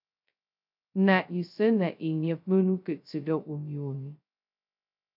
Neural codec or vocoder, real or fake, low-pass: codec, 16 kHz, 0.2 kbps, FocalCodec; fake; 5.4 kHz